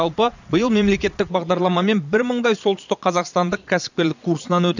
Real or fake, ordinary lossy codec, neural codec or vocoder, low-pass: fake; none; vocoder, 22.05 kHz, 80 mel bands, Vocos; 7.2 kHz